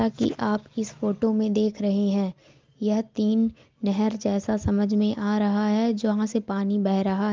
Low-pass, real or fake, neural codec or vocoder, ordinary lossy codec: 7.2 kHz; real; none; Opus, 32 kbps